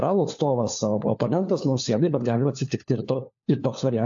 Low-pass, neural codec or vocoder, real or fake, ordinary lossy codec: 7.2 kHz; codec, 16 kHz, 4 kbps, FunCodec, trained on LibriTTS, 50 frames a second; fake; AAC, 64 kbps